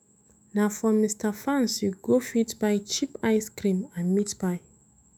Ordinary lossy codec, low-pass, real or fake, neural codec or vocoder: none; none; fake; autoencoder, 48 kHz, 128 numbers a frame, DAC-VAE, trained on Japanese speech